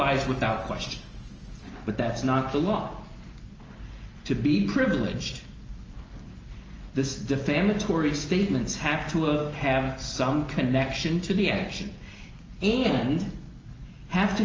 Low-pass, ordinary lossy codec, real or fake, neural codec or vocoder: 7.2 kHz; Opus, 24 kbps; real; none